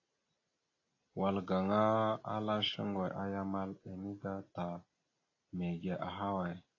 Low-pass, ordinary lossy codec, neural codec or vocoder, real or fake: 7.2 kHz; AAC, 32 kbps; none; real